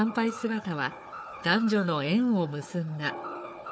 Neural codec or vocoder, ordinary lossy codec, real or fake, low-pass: codec, 16 kHz, 4 kbps, FunCodec, trained on Chinese and English, 50 frames a second; none; fake; none